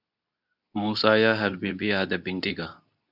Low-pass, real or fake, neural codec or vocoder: 5.4 kHz; fake; codec, 24 kHz, 0.9 kbps, WavTokenizer, medium speech release version 2